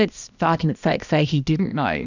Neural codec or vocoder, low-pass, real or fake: codec, 16 kHz, 1 kbps, X-Codec, HuBERT features, trained on balanced general audio; 7.2 kHz; fake